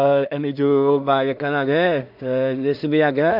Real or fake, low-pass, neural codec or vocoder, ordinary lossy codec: fake; 5.4 kHz; codec, 16 kHz in and 24 kHz out, 0.4 kbps, LongCat-Audio-Codec, two codebook decoder; none